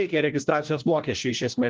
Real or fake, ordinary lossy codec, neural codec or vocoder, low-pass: fake; Opus, 24 kbps; codec, 16 kHz, 1 kbps, FunCodec, trained on LibriTTS, 50 frames a second; 7.2 kHz